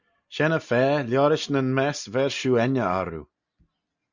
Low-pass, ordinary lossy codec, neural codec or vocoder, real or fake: 7.2 kHz; Opus, 64 kbps; none; real